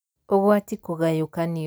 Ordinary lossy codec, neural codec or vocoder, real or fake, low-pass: none; none; real; none